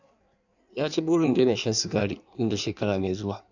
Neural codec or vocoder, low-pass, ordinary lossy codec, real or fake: codec, 16 kHz in and 24 kHz out, 1.1 kbps, FireRedTTS-2 codec; 7.2 kHz; none; fake